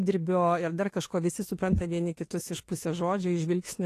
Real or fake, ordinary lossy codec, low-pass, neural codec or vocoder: fake; AAC, 48 kbps; 14.4 kHz; autoencoder, 48 kHz, 32 numbers a frame, DAC-VAE, trained on Japanese speech